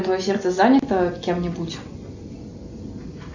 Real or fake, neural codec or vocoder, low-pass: real; none; 7.2 kHz